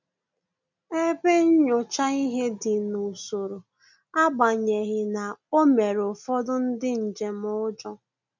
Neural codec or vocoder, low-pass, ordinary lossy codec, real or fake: none; 7.2 kHz; none; real